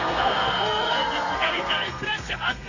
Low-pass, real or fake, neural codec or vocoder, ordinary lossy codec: 7.2 kHz; fake; codec, 32 kHz, 1.9 kbps, SNAC; none